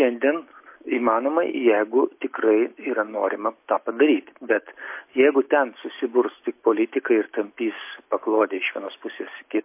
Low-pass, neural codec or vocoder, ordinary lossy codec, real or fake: 3.6 kHz; none; MP3, 24 kbps; real